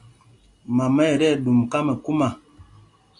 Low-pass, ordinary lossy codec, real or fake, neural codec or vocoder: 10.8 kHz; AAC, 64 kbps; real; none